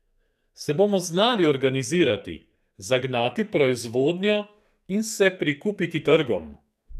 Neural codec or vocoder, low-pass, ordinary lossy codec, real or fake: codec, 44.1 kHz, 2.6 kbps, SNAC; 14.4 kHz; none; fake